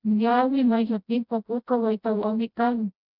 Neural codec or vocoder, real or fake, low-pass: codec, 16 kHz, 0.5 kbps, FreqCodec, smaller model; fake; 5.4 kHz